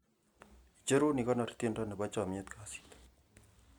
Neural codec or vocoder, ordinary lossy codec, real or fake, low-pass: none; none; real; 19.8 kHz